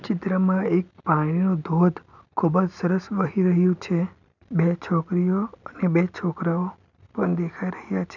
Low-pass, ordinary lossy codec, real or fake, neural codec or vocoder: 7.2 kHz; none; real; none